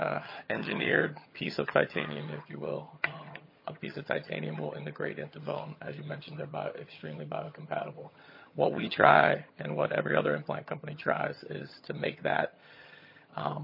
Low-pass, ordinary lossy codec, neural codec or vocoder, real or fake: 5.4 kHz; MP3, 24 kbps; vocoder, 22.05 kHz, 80 mel bands, HiFi-GAN; fake